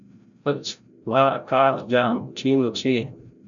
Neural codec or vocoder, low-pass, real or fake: codec, 16 kHz, 0.5 kbps, FreqCodec, larger model; 7.2 kHz; fake